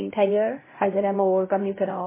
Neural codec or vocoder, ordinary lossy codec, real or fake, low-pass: codec, 16 kHz, 0.5 kbps, X-Codec, HuBERT features, trained on LibriSpeech; MP3, 16 kbps; fake; 3.6 kHz